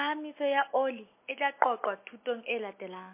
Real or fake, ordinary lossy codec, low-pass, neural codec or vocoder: real; MP3, 24 kbps; 3.6 kHz; none